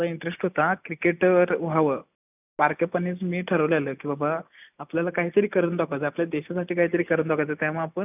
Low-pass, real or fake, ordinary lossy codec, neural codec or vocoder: 3.6 kHz; real; none; none